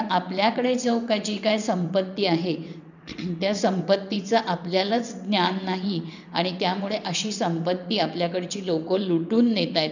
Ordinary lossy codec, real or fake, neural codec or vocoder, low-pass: none; fake; vocoder, 22.05 kHz, 80 mel bands, WaveNeXt; 7.2 kHz